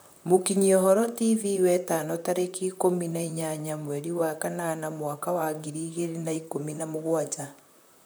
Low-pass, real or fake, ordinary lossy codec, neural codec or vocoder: none; fake; none; vocoder, 44.1 kHz, 128 mel bands, Pupu-Vocoder